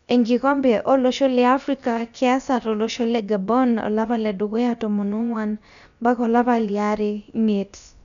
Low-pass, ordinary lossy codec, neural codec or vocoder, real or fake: 7.2 kHz; none; codec, 16 kHz, about 1 kbps, DyCAST, with the encoder's durations; fake